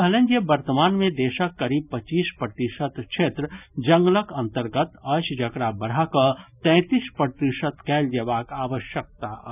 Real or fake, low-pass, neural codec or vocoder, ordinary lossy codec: real; 3.6 kHz; none; none